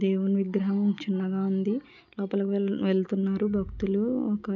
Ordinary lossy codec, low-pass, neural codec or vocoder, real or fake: none; 7.2 kHz; none; real